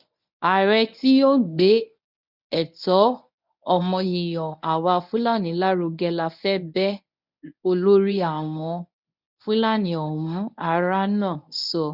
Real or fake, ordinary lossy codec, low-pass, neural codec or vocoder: fake; none; 5.4 kHz; codec, 24 kHz, 0.9 kbps, WavTokenizer, medium speech release version 1